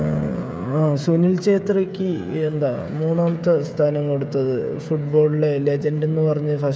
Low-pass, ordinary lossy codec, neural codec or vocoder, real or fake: none; none; codec, 16 kHz, 16 kbps, FreqCodec, smaller model; fake